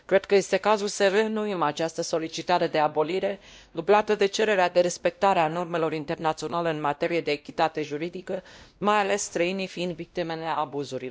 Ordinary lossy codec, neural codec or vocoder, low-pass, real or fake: none; codec, 16 kHz, 1 kbps, X-Codec, WavLM features, trained on Multilingual LibriSpeech; none; fake